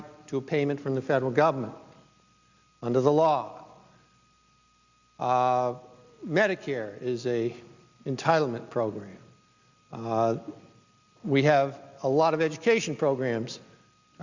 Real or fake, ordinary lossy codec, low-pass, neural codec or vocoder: real; Opus, 64 kbps; 7.2 kHz; none